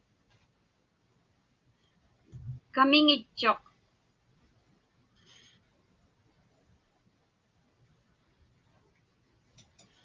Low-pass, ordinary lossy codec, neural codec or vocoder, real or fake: 7.2 kHz; Opus, 32 kbps; none; real